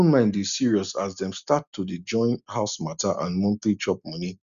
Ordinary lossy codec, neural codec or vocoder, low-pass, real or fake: none; none; 7.2 kHz; real